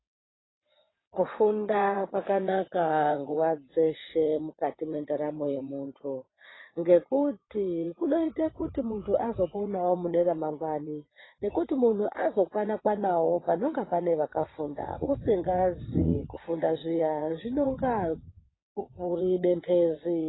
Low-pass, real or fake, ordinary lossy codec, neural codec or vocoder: 7.2 kHz; fake; AAC, 16 kbps; vocoder, 22.05 kHz, 80 mel bands, WaveNeXt